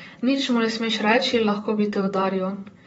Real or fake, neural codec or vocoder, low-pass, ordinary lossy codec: fake; vocoder, 44.1 kHz, 128 mel bands, Pupu-Vocoder; 19.8 kHz; AAC, 24 kbps